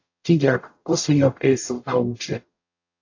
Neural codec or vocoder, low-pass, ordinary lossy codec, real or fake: codec, 44.1 kHz, 0.9 kbps, DAC; 7.2 kHz; AAC, 48 kbps; fake